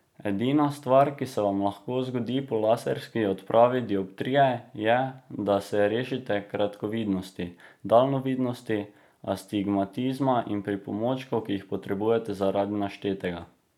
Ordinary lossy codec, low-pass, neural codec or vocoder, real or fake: none; 19.8 kHz; none; real